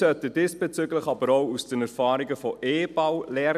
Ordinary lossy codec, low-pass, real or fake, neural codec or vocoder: none; 14.4 kHz; real; none